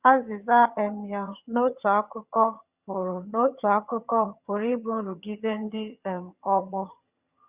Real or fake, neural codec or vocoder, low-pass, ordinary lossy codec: fake; vocoder, 22.05 kHz, 80 mel bands, HiFi-GAN; 3.6 kHz; Opus, 64 kbps